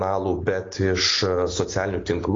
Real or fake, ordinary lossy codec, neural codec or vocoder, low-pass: real; AAC, 32 kbps; none; 7.2 kHz